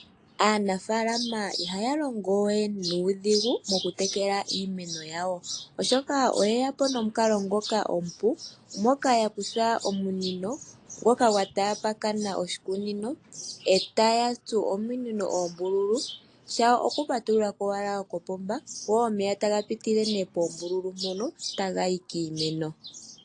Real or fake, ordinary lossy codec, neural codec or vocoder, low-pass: real; AAC, 48 kbps; none; 9.9 kHz